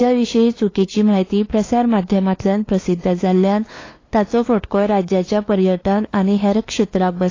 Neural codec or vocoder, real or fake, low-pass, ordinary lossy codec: codec, 16 kHz, 2 kbps, FunCodec, trained on LibriTTS, 25 frames a second; fake; 7.2 kHz; AAC, 32 kbps